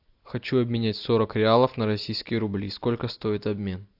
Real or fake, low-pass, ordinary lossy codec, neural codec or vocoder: real; 5.4 kHz; AAC, 48 kbps; none